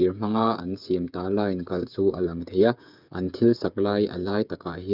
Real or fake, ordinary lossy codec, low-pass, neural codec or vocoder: fake; none; 5.4 kHz; codec, 16 kHz, 8 kbps, FreqCodec, smaller model